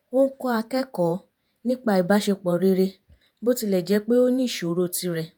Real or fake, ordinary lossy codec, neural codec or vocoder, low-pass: fake; none; vocoder, 48 kHz, 128 mel bands, Vocos; none